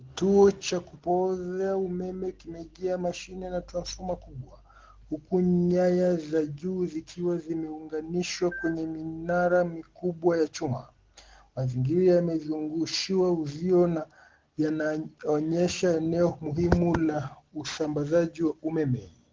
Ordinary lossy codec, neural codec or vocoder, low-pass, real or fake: Opus, 16 kbps; none; 7.2 kHz; real